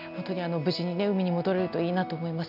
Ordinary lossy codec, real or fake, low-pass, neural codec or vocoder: none; real; 5.4 kHz; none